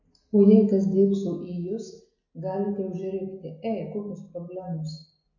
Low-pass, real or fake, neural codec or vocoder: 7.2 kHz; real; none